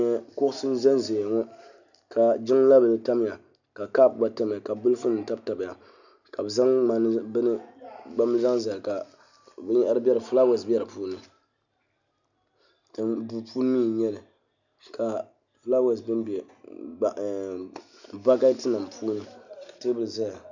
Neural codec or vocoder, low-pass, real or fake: none; 7.2 kHz; real